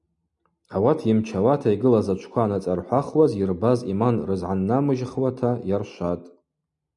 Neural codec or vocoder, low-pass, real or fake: none; 10.8 kHz; real